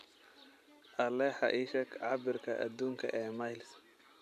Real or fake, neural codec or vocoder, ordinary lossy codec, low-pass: real; none; none; 14.4 kHz